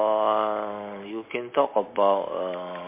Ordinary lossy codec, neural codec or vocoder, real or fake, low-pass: MP3, 24 kbps; none; real; 3.6 kHz